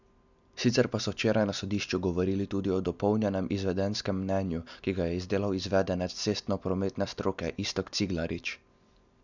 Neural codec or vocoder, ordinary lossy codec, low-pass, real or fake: none; none; 7.2 kHz; real